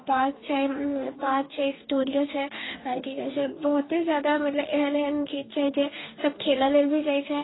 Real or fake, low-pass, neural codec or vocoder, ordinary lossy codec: fake; 7.2 kHz; codec, 44.1 kHz, 2.6 kbps, DAC; AAC, 16 kbps